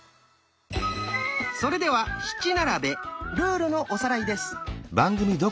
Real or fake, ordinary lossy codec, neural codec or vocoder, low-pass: real; none; none; none